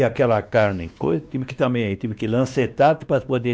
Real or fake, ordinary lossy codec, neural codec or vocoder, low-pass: fake; none; codec, 16 kHz, 2 kbps, X-Codec, WavLM features, trained on Multilingual LibriSpeech; none